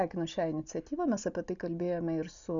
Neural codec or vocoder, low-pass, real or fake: none; 7.2 kHz; real